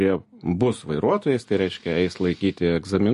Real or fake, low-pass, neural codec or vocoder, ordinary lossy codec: real; 14.4 kHz; none; MP3, 48 kbps